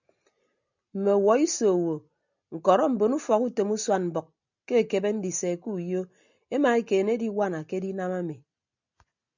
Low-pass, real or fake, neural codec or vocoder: 7.2 kHz; real; none